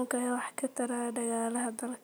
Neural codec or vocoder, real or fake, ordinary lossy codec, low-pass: none; real; none; none